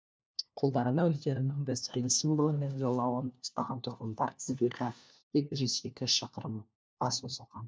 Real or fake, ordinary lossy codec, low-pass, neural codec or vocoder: fake; none; none; codec, 16 kHz, 1 kbps, FunCodec, trained on LibriTTS, 50 frames a second